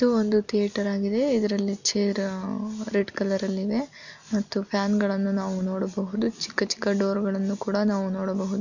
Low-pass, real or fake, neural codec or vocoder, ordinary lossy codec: 7.2 kHz; real; none; none